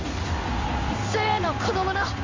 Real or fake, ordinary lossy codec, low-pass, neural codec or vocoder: fake; MP3, 64 kbps; 7.2 kHz; codec, 16 kHz in and 24 kHz out, 1 kbps, XY-Tokenizer